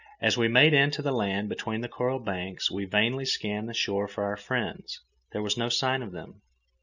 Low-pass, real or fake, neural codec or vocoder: 7.2 kHz; real; none